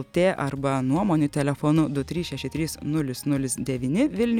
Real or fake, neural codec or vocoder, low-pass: real; none; 19.8 kHz